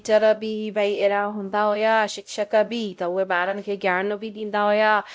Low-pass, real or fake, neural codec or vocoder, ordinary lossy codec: none; fake; codec, 16 kHz, 0.5 kbps, X-Codec, WavLM features, trained on Multilingual LibriSpeech; none